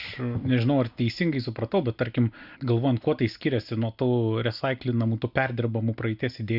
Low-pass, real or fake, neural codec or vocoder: 5.4 kHz; real; none